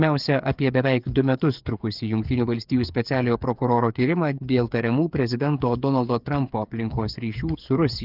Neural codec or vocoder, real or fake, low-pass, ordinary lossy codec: codec, 16 kHz, 8 kbps, FreqCodec, smaller model; fake; 5.4 kHz; Opus, 24 kbps